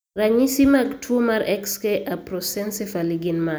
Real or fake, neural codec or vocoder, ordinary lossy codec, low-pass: real; none; none; none